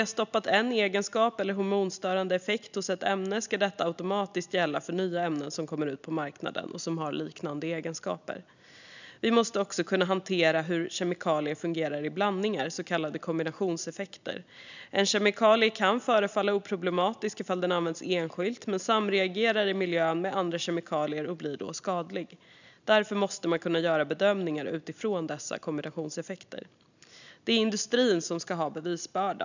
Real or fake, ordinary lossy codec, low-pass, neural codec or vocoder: real; none; 7.2 kHz; none